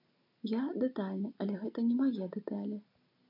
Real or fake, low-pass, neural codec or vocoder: real; 5.4 kHz; none